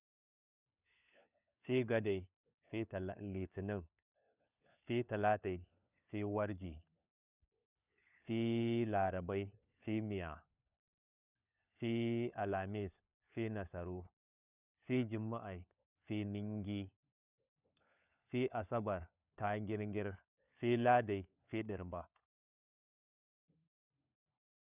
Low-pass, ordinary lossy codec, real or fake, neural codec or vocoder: 3.6 kHz; none; fake; codec, 16 kHz, 4 kbps, FunCodec, trained on LibriTTS, 50 frames a second